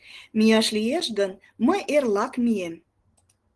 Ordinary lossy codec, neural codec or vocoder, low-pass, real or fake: Opus, 16 kbps; none; 10.8 kHz; real